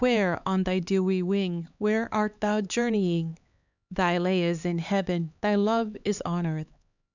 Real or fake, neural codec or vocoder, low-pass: fake; codec, 16 kHz, 4 kbps, X-Codec, HuBERT features, trained on LibriSpeech; 7.2 kHz